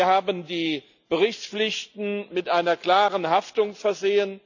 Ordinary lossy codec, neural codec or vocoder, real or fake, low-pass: none; none; real; 7.2 kHz